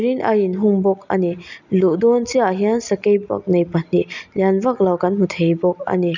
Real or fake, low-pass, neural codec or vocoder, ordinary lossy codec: real; 7.2 kHz; none; none